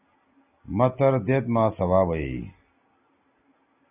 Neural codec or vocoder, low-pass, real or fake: none; 3.6 kHz; real